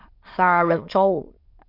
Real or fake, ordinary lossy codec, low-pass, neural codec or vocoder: fake; MP3, 32 kbps; 5.4 kHz; autoencoder, 22.05 kHz, a latent of 192 numbers a frame, VITS, trained on many speakers